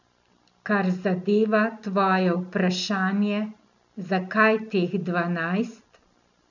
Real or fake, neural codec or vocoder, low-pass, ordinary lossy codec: real; none; 7.2 kHz; none